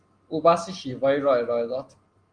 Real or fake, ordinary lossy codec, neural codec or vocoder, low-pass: real; Opus, 24 kbps; none; 9.9 kHz